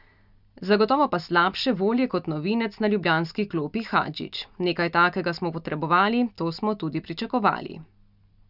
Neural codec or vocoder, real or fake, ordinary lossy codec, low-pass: none; real; none; 5.4 kHz